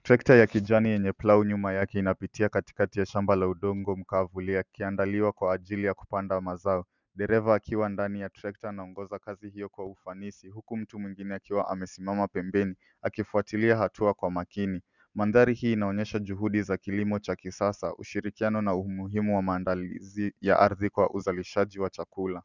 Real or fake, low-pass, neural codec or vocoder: real; 7.2 kHz; none